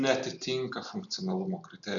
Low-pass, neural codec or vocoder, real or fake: 7.2 kHz; none; real